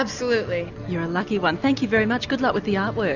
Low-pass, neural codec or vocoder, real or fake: 7.2 kHz; none; real